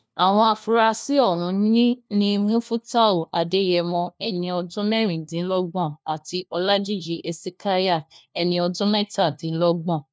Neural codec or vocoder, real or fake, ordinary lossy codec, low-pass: codec, 16 kHz, 1 kbps, FunCodec, trained on LibriTTS, 50 frames a second; fake; none; none